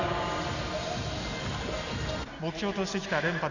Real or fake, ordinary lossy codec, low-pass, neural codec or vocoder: real; none; 7.2 kHz; none